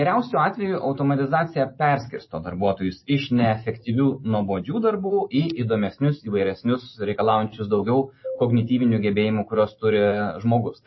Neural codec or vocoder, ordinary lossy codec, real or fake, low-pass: none; MP3, 24 kbps; real; 7.2 kHz